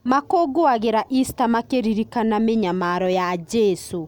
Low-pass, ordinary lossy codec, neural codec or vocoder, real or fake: 19.8 kHz; none; none; real